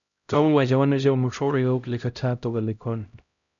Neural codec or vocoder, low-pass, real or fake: codec, 16 kHz, 0.5 kbps, X-Codec, HuBERT features, trained on LibriSpeech; 7.2 kHz; fake